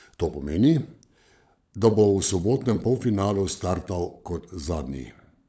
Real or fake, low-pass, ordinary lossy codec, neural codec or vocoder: fake; none; none; codec, 16 kHz, 16 kbps, FunCodec, trained on LibriTTS, 50 frames a second